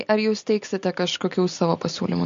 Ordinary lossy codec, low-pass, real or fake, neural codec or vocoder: MP3, 48 kbps; 7.2 kHz; real; none